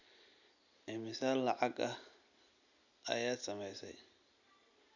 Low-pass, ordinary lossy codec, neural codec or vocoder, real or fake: 7.2 kHz; none; none; real